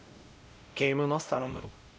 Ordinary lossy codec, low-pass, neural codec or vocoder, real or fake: none; none; codec, 16 kHz, 0.5 kbps, X-Codec, WavLM features, trained on Multilingual LibriSpeech; fake